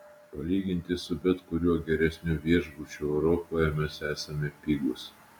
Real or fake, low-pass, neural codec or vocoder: real; 19.8 kHz; none